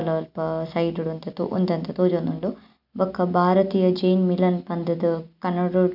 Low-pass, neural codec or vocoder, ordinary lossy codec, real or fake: 5.4 kHz; none; none; real